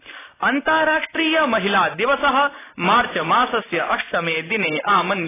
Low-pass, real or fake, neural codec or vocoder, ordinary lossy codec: 3.6 kHz; real; none; AAC, 16 kbps